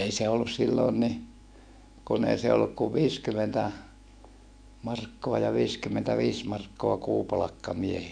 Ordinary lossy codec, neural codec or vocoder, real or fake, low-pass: none; autoencoder, 48 kHz, 128 numbers a frame, DAC-VAE, trained on Japanese speech; fake; 9.9 kHz